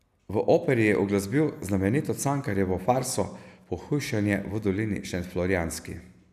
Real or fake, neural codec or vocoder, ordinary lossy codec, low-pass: real; none; none; 14.4 kHz